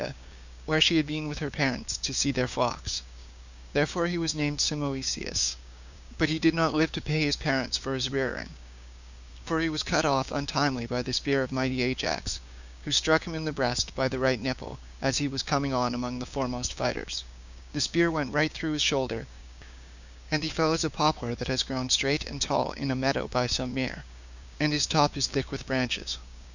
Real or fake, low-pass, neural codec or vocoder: fake; 7.2 kHz; codec, 16 kHz, 6 kbps, DAC